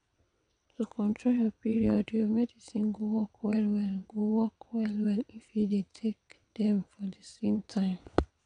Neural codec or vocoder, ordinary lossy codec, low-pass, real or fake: vocoder, 22.05 kHz, 80 mel bands, WaveNeXt; none; 9.9 kHz; fake